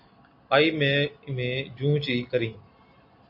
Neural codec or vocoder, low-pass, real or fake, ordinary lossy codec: none; 5.4 kHz; real; MP3, 32 kbps